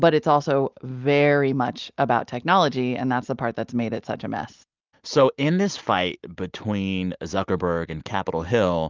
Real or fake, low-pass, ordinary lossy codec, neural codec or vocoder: real; 7.2 kHz; Opus, 24 kbps; none